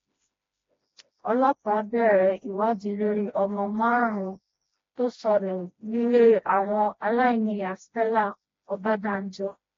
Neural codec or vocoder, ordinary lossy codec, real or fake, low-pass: codec, 16 kHz, 1 kbps, FreqCodec, smaller model; AAC, 32 kbps; fake; 7.2 kHz